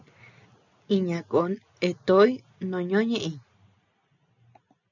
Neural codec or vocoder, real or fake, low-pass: none; real; 7.2 kHz